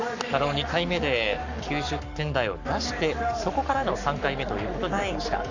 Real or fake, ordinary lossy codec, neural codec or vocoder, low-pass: fake; none; codec, 44.1 kHz, 7.8 kbps, Pupu-Codec; 7.2 kHz